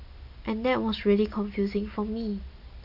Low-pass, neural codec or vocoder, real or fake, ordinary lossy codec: 5.4 kHz; none; real; AAC, 48 kbps